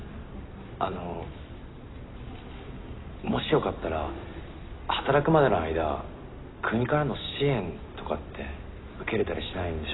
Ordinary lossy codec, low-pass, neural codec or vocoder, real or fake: AAC, 16 kbps; 7.2 kHz; none; real